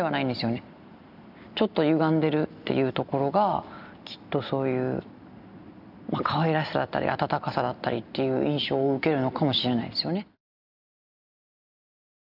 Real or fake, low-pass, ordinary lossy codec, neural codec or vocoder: real; 5.4 kHz; none; none